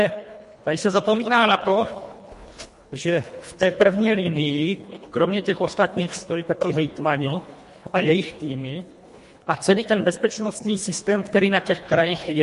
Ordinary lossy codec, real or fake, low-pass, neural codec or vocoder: MP3, 48 kbps; fake; 10.8 kHz; codec, 24 kHz, 1.5 kbps, HILCodec